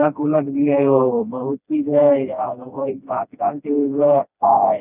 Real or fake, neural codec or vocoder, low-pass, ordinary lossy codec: fake; codec, 16 kHz, 1 kbps, FreqCodec, smaller model; 3.6 kHz; none